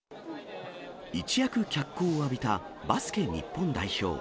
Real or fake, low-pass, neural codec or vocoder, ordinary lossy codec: real; none; none; none